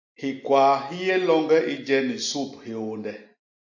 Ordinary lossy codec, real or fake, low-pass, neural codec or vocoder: AAC, 48 kbps; real; 7.2 kHz; none